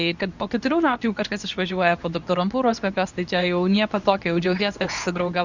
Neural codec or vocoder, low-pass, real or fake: codec, 24 kHz, 0.9 kbps, WavTokenizer, medium speech release version 2; 7.2 kHz; fake